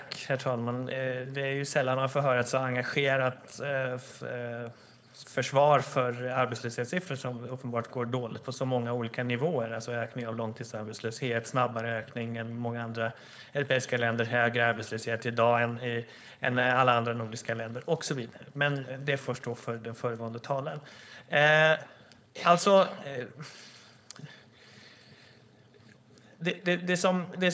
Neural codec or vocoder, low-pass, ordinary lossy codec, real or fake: codec, 16 kHz, 4.8 kbps, FACodec; none; none; fake